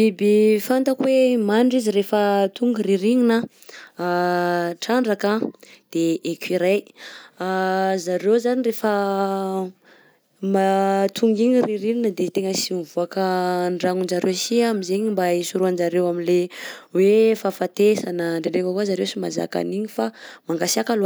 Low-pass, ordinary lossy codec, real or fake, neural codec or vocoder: none; none; real; none